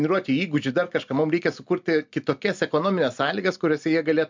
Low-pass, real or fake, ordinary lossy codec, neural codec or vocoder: 7.2 kHz; real; MP3, 64 kbps; none